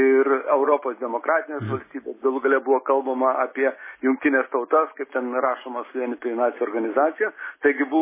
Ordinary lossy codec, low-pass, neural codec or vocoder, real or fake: MP3, 16 kbps; 3.6 kHz; none; real